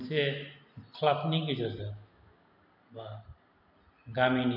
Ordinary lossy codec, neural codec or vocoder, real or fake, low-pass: none; none; real; 5.4 kHz